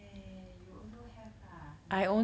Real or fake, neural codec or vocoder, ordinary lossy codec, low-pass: real; none; none; none